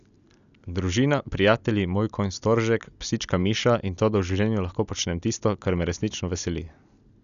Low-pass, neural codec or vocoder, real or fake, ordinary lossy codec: 7.2 kHz; none; real; none